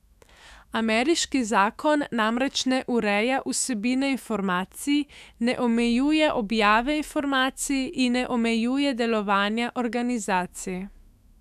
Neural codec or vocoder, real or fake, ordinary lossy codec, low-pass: autoencoder, 48 kHz, 128 numbers a frame, DAC-VAE, trained on Japanese speech; fake; none; 14.4 kHz